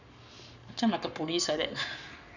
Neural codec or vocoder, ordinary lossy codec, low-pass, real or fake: codec, 44.1 kHz, 7.8 kbps, Pupu-Codec; none; 7.2 kHz; fake